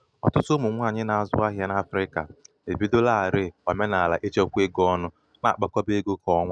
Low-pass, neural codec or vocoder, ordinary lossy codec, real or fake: 9.9 kHz; none; none; real